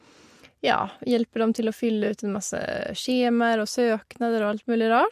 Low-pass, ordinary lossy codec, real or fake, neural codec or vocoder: 14.4 kHz; MP3, 64 kbps; real; none